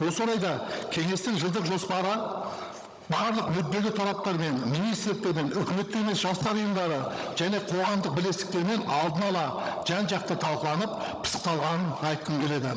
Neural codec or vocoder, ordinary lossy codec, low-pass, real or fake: codec, 16 kHz, 16 kbps, FunCodec, trained on Chinese and English, 50 frames a second; none; none; fake